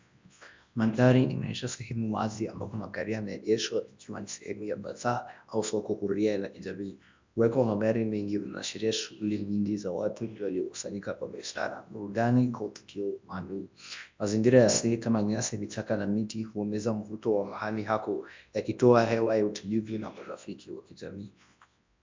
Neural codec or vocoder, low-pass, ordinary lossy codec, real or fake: codec, 24 kHz, 0.9 kbps, WavTokenizer, large speech release; 7.2 kHz; MP3, 64 kbps; fake